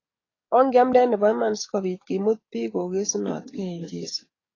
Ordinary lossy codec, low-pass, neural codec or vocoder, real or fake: AAC, 32 kbps; 7.2 kHz; codec, 44.1 kHz, 7.8 kbps, DAC; fake